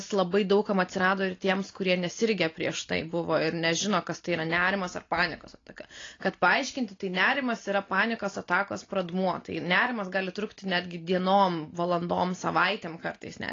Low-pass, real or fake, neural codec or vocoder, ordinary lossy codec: 7.2 kHz; real; none; AAC, 32 kbps